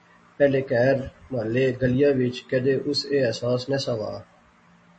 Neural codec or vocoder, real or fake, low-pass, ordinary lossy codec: none; real; 10.8 kHz; MP3, 32 kbps